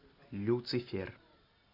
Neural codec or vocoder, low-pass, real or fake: none; 5.4 kHz; real